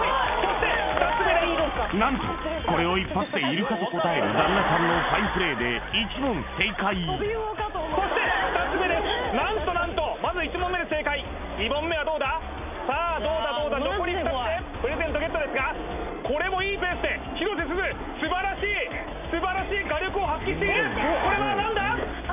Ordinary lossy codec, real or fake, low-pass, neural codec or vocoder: none; real; 3.6 kHz; none